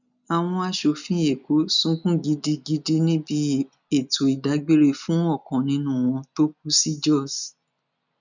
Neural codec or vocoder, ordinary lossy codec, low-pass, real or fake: none; none; 7.2 kHz; real